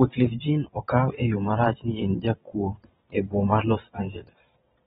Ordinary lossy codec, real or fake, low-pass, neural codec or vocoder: AAC, 16 kbps; fake; 9.9 kHz; vocoder, 22.05 kHz, 80 mel bands, WaveNeXt